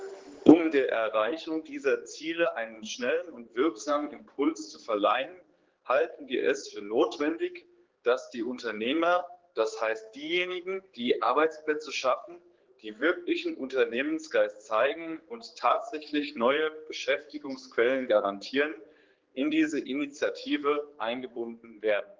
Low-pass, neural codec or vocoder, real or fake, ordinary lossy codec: 7.2 kHz; codec, 16 kHz, 4 kbps, X-Codec, HuBERT features, trained on general audio; fake; Opus, 16 kbps